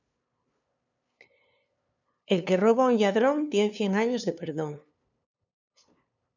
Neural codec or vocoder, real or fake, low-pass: codec, 16 kHz, 2 kbps, FunCodec, trained on LibriTTS, 25 frames a second; fake; 7.2 kHz